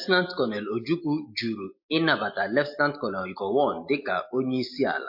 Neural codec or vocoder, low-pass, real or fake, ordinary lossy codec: none; 5.4 kHz; real; MP3, 32 kbps